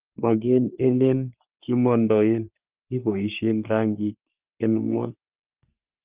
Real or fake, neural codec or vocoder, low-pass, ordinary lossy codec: fake; codec, 44.1 kHz, 3.4 kbps, Pupu-Codec; 3.6 kHz; Opus, 32 kbps